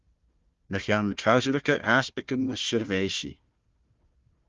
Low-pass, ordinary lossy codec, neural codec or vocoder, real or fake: 7.2 kHz; Opus, 32 kbps; codec, 16 kHz, 1 kbps, FunCodec, trained on Chinese and English, 50 frames a second; fake